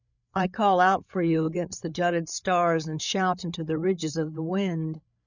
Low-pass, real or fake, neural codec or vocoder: 7.2 kHz; fake; codec, 16 kHz, 8 kbps, FreqCodec, larger model